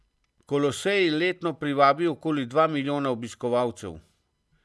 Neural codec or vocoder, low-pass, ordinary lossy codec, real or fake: none; none; none; real